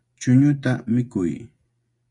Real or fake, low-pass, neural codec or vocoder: fake; 10.8 kHz; vocoder, 24 kHz, 100 mel bands, Vocos